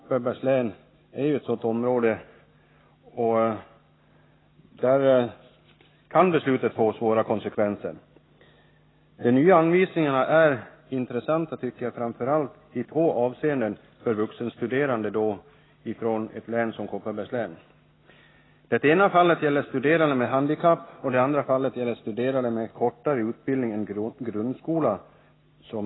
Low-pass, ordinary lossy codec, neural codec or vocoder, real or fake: 7.2 kHz; AAC, 16 kbps; none; real